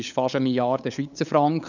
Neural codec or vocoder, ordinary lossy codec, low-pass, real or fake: codec, 16 kHz, 8 kbps, FunCodec, trained on LibriTTS, 25 frames a second; none; 7.2 kHz; fake